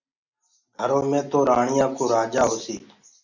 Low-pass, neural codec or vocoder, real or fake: 7.2 kHz; none; real